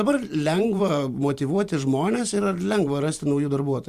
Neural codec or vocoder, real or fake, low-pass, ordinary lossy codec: vocoder, 44.1 kHz, 128 mel bands every 512 samples, BigVGAN v2; fake; 14.4 kHz; Opus, 64 kbps